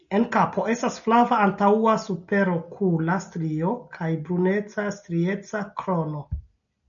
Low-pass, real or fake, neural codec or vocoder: 7.2 kHz; real; none